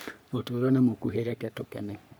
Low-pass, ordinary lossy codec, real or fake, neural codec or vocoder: none; none; fake; codec, 44.1 kHz, 3.4 kbps, Pupu-Codec